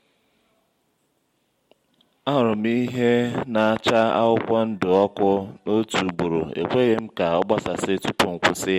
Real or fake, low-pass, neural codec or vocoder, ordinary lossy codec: real; 19.8 kHz; none; MP3, 64 kbps